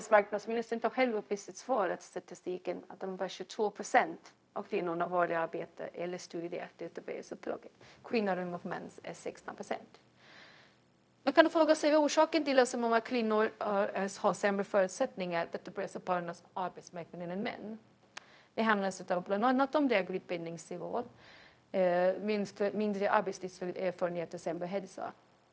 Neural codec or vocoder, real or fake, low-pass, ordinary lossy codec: codec, 16 kHz, 0.4 kbps, LongCat-Audio-Codec; fake; none; none